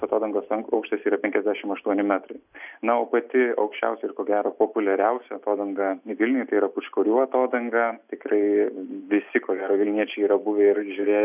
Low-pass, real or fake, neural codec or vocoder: 3.6 kHz; real; none